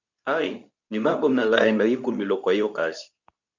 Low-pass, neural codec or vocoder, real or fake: 7.2 kHz; codec, 24 kHz, 0.9 kbps, WavTokenizer, medium speech release version 2; fake